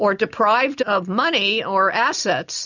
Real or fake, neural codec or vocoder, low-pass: real; none; 7.2 kHz